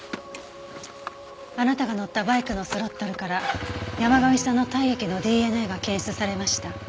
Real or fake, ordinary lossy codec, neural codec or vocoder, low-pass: real; none; none; none